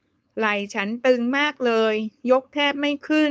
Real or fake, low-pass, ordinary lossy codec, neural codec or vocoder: fake; none; none; codec, 16 kHz, 4.8 kbps, FACodec